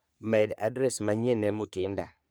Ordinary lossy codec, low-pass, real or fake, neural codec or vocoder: none; none; fake; codec, 44.1 kHz, 3.4 kbps, Pupu-Codec